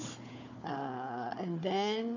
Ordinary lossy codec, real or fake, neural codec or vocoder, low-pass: none; fake; codec, 16 kHz, 4 kbps, FunCodec, trained on Chinese and English, 50 frames a second; 7.2 kHz